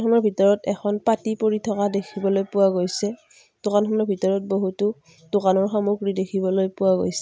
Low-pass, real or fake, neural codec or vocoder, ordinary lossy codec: none; real; none; none